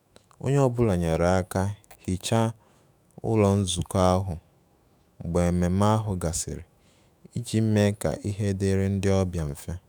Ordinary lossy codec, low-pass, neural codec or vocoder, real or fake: none; none; autoencoder, 48 kHz, 128 numbers a frame, DAC-VAE, trained on Japanese speech; fake